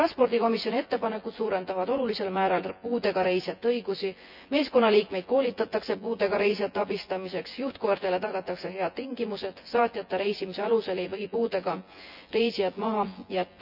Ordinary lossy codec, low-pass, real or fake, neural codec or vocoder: none; 5.4 kHz; fake; vocoder, 24 kHz, 100 mel bands, Vocos